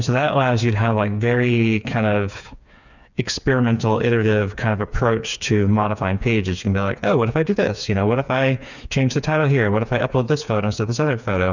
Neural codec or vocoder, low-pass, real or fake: codec, 16 kHz, 4 kbps, FreqCodec, smaller model; 7.2 kHz; fake